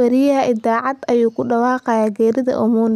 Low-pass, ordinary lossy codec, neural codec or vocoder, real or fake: 14.4 kHz; none; none; real